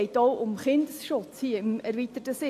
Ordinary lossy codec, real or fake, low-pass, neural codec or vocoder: AAC, 64 kbps; real; 14.4 kHz; none